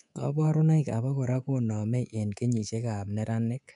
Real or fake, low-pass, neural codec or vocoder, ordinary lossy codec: fake; 10.8 kHz; codec, 24 kHz, 3.1 kbps, DualCodec; none